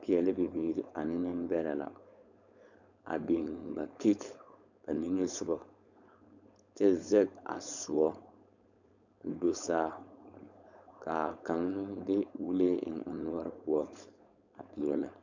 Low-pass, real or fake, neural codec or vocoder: 7.2 kHz; fake; codec, 16 kHz, 4.8 kbps, FACodec